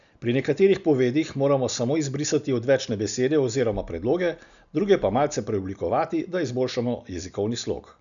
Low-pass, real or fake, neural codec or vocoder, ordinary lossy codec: 7.2 kHz; real; none; none